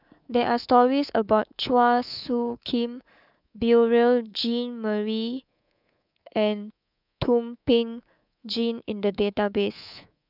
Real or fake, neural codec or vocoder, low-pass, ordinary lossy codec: fake; codec, 24 kHz, 3.1 kbps, DualCodec; 5.4 kHz; none